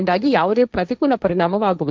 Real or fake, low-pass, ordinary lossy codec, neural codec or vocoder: fake; none; none; codec, 16 kHz, 1.1 kbps, Voila-Tokenizer